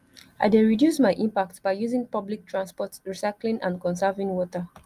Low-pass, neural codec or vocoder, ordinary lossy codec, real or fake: 14.4 kHz; none; Opus, 32 kbps; real